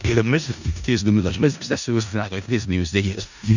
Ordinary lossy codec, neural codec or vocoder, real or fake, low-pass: none; codec, 16 kHz in and 24 kHz out, 0.4 kbps, LongCat-Audio-Codec, four codebook decoder; fake; 7.2 kHz